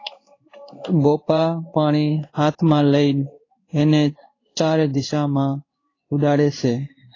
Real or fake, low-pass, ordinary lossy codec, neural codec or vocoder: fake; 7.2 kHz; AAC, 32 kbps; codec, 16 kHz in and 24 kHz out, 1 kbps, XY-Tokenizer